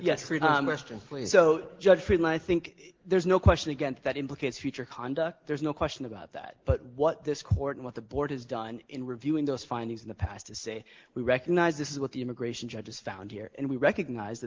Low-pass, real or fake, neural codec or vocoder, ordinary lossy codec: 7.2 kHz; real; none; Opus, 16 kbps